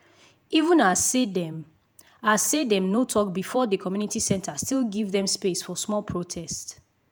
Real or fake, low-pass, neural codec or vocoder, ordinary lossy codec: fake; none; vocoder, 48 kHz, 128 mel bands, Vocos; none